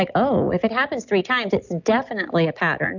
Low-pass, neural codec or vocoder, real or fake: 7.2 kHz; none; real